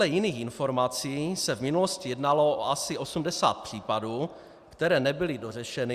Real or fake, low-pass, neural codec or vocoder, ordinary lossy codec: real; 14.4 kHz; none; Opus, 64 kbps